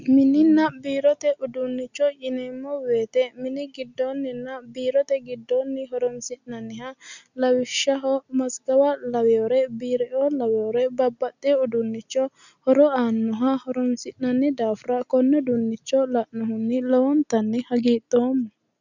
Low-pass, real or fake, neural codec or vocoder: 7.2 kHz; real; none